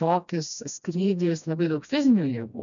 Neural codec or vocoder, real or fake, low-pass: codec, 16 kHz, 1 kbps, FreqCodec, smaller model; fake; 7.2 kHz